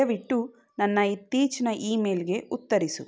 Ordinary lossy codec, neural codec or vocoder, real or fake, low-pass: none; none; real; none